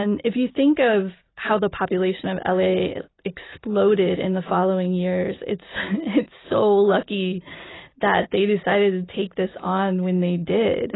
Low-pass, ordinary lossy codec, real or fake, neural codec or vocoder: 7.2 kHz; AAC, 16 kbps; real; none